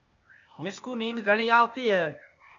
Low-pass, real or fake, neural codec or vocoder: 7.2 kHz; fake; codec, 16 kHz, 0.8 kbps, ZipCodec